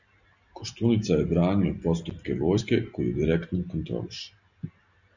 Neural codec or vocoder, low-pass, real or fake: none; 7.2 kHz; real